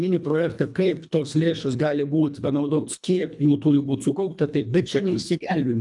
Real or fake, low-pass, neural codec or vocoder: fake; 10.8 kHz; codec, 24 kHz, 1.5 kbps, HILCodec